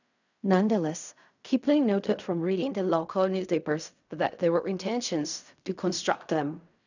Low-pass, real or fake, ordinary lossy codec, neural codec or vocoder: 7.2 kHz; fake; none; codec, 16 kHz in and 24 kHz out, 0.4 kbps, LongCat-Audio-Codec, fine tuned four codebook decoder